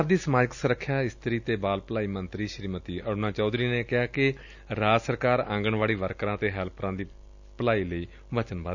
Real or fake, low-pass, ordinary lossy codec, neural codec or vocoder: real; 7.2 kHz; none; none